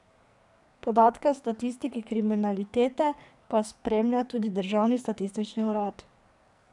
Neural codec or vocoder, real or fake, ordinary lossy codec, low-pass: codec, 44.1 kHz, 2.6 kbps, SNAC; fake; none; 10.8 kHz